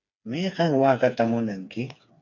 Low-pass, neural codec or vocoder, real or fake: 7.2 kHz; codec, 16 kHz, 4 kbps, FreqCodec, smaller model; fake